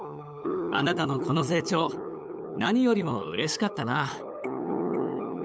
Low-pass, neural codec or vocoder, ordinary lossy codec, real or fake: none; codec, 16 kHz, 8 kbps, FunCodec, trained on LibriTTS, 25 frames a second; none; fake